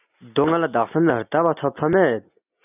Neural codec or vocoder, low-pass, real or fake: none; 3.6 kHz; real